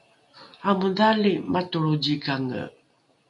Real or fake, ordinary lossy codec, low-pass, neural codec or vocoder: real; MP3, 48 kbps; 10.8 kHz; none